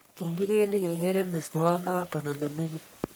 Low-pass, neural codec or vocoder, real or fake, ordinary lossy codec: none; codec, 44.1 kHz, 1.7 kbps, Pupu-Codec; fake; none